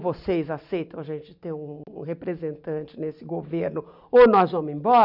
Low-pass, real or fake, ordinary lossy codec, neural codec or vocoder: 5.4 kHz; real; none; none